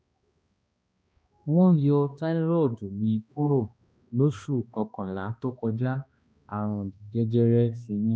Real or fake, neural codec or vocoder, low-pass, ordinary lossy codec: fake; codec, 16 kHz, 1 kbps, X-Codec, HuBERT features, trained on balanced general audio; none; none